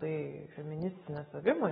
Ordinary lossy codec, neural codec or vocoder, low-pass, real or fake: AAC, 16 kbps; none; 19.8 kHz; real